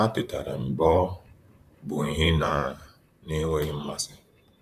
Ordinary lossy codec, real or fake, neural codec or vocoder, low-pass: none; fake; vocoder, 44.1 kHz, 128 mel bands, Pupu-Vocoder; 14.4 kHz